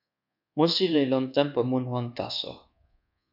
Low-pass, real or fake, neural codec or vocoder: 5.4 kHz; fake; codec, 24 kHz, 1.2 kbps, DualCodec